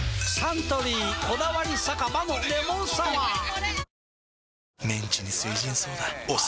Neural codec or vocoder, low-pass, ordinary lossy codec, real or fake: none; none; none; real